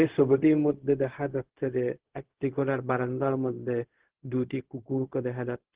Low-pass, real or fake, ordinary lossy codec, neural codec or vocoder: 3.6 kHz; fake; Opus, 16 kbps; codec, 16 kHz, 0.4 kbps, LongCat-Audio-Codec